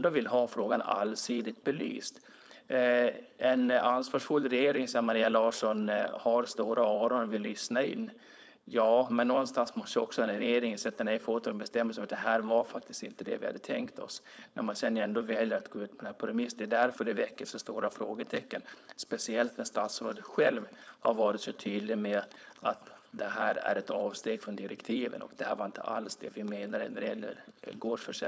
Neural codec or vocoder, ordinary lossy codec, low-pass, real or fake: codec, 16 kHz, 4.8 kbps, FACodec; none; none; fake